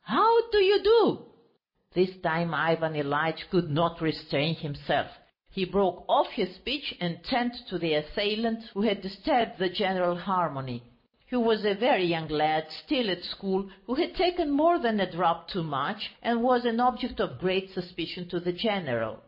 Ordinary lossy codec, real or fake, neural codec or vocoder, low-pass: MP3, 24 kbps; real; none; 5.4 kHz